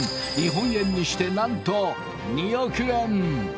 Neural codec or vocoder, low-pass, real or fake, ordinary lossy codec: none; none; real; none